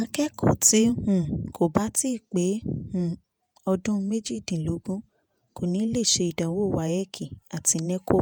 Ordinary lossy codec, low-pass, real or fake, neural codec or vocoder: none; none; fake; vocoder, 48 kHz, 128 mel bands, Vocos